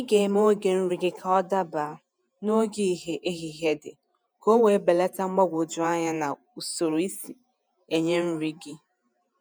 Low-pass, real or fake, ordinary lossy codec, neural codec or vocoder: none; fake; none; vocoder, 48 kHz, 128 mel bands, Vocos